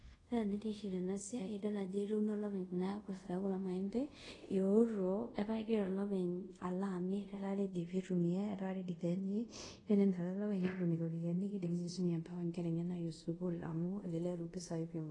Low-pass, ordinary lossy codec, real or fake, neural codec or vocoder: 10.8 kHz; AAC, 32 kbps; fake; codec, 24 kHz, 0.5 kbps, DualCodec